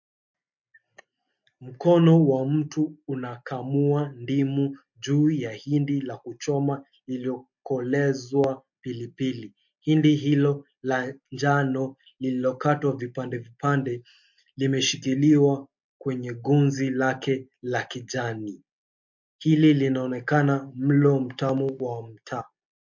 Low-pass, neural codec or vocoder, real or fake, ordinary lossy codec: 7.2 kHz; none; real; MP3, 48 kbps